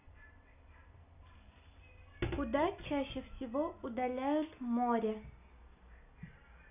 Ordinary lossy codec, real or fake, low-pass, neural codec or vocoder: none; real; 3.6 kHz; none